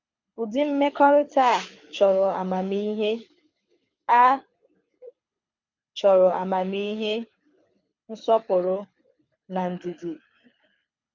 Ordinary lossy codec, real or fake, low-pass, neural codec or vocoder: MP3, 48 kbps; fake; 7.2 kHz; codec, 24 kHz, 6 kbps, HILCodec